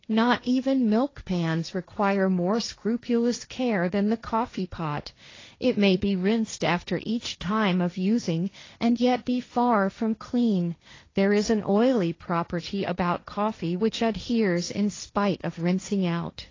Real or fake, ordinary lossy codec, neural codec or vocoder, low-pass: fake; AAC, 32 kbps; codec, 16 kHz, 1.1 kbps, Voila-Tokenizer; 7.2 kHz